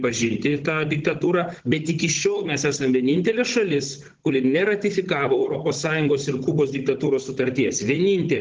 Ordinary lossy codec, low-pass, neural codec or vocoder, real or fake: Opus, 16 kbps; 7.2 kHz; codec, 16 kHz, 16 kbps, FunCodec, trained on Chinese and English, 50 frames a second; fake